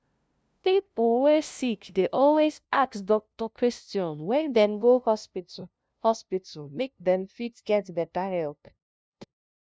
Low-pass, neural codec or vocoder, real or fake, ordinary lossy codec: none; codec, 16 kHz, 0.5 kbps, FunCodec, trained on LibriTTS, 25 frames a second; fake; none